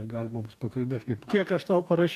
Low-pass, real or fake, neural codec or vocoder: 14.4 kHz; fake; codec, 44.1 kHz, 2.6 kbps, DAC